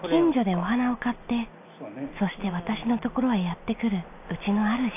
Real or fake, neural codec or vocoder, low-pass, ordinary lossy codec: real; none; 3.6 kHz; AAC, 32 kbps